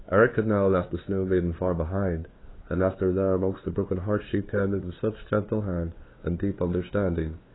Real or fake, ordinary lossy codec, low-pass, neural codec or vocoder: fake; AAC, 16 kbps; 7.2 kHz; codec, 16 kHz, 2 kbps, FunCodec, trained on LibriTTS, 25 frames a second